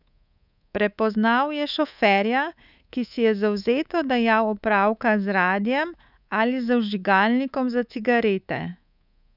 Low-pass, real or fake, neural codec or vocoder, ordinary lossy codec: 5.4 kHz; fake; codec, 24 kHz, 3.1 kbps, DualCodec; none